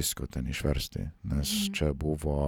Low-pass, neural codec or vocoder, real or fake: 19.8 kHz; none; real